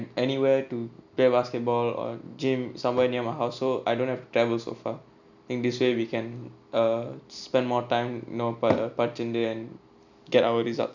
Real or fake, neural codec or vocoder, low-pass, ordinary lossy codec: real; none; 7.2 kHz; none